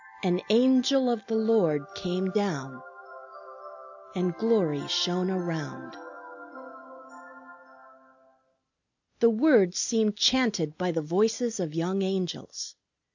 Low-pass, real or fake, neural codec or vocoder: 7.2 kHz; real; none